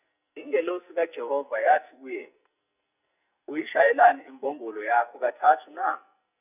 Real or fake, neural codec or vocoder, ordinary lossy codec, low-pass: fake; codec, 44.1 kHz, 2.6 kbps, SNAC; none; 3.6 kHz